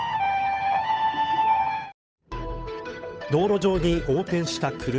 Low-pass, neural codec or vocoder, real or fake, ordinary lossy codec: none; codec, 16 kHz, 8 kbps, FunCodec, trained on Chinese and English, 25 frames a second; fake; none